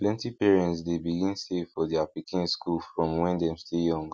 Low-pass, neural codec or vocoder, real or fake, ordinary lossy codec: none; none; real; none